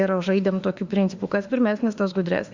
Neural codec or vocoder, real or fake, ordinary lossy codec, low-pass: autoencoder, 48 kHz, 32 numbers a frame, DAC-VAE, trained on Japanese speech; fake; Opus, 64 kbps; 7.2 kHz